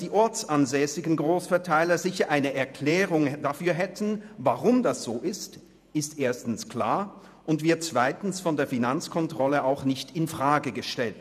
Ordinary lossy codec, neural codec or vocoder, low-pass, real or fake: none; vocoder, 48 kHz, 128 mel bands, Vocos; 14.4 kHz; fake